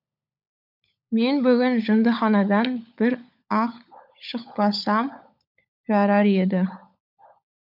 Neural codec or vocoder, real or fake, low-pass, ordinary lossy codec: codec, 16 kHz, 16 kbps, FunCodec, trained on LibriTTS, 50 frames a second; fake; 5.4 kHz; none